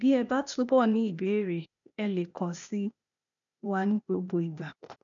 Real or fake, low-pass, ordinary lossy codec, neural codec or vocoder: fake; 7.2 kHz; none; codec, 16 kHz, 0.8 kbps, ZipCodec